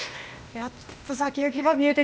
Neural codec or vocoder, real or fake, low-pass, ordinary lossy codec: codec, 16 kHz, 0.8 kbps, ZipCodec; fake; none; none